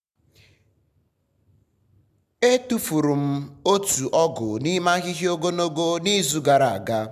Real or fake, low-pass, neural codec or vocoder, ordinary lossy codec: real; 14.4 kHz; none; none